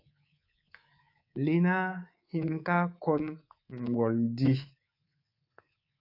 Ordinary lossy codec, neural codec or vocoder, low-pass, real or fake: AAC, 32 kbps; codec, 24 kHz, 3.1 kbps, DualCodec; 5.4 kHz; fake